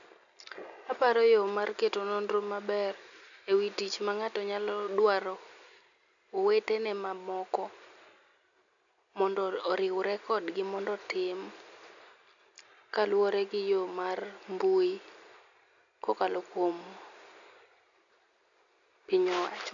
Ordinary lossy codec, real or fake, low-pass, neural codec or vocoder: none; real; 7.2 kHz; none